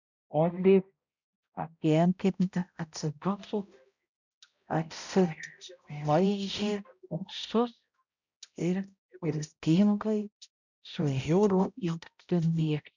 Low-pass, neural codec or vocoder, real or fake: 7.2 kHz; codec, 16 kHz, 0.5 kbps, X-Codec, HuBERT features, trained on balanced general audio; fake